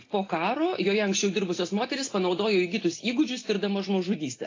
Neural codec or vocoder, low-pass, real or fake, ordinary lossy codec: none; 7.2 kHz; real; AAC, 32 kbps